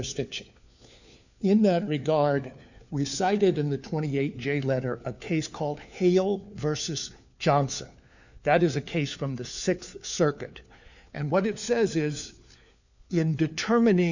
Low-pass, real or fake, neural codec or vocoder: 7.2 kHz; fake; codec, 16 kHz, 4 kbps, FunCodec, trained on LibriTTS, 50 frames a second